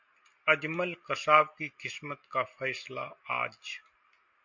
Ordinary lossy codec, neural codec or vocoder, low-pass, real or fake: MP3, 48 kbps; none; 7.2 kHz; real